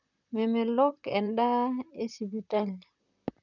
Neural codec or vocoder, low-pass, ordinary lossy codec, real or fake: vocoder, 44.1 kHz, 128 mel bands, Pupu-Vocoder; 7.2 kHz; none; fake